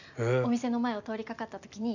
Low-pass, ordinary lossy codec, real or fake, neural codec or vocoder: 7.2 kHz; none; real; none